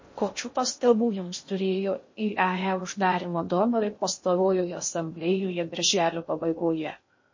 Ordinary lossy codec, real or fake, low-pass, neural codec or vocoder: MP3, 32 kbps; fake; 7.2 kHz; codec, 16 kHz in and 24 kHz out, 0.6 kbps, FocalCodec, streaming, 2048 codes